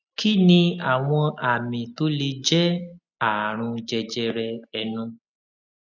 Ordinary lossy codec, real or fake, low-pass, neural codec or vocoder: none; real; 7.2 kHz; none